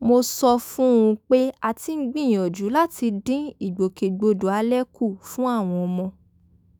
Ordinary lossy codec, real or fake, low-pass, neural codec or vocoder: none; fake; none; autoencoder, 48 kHz, 128 numbers a frame, DAC-VAE, trained on Japanese speech